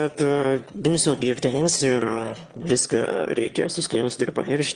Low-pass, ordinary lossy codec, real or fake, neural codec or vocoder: 9.9 kHz; Opus, 24 kbps; fake; autoencoder, 22.05 kHz, a latent of 192 numbers a frame, VITS, trained on one speaker